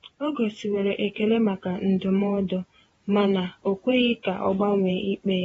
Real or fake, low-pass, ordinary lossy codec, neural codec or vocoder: fake; 19.8 kHz; AAC, 24 kbps; vocoder, 48 kHz, 128 mel bands, Vocos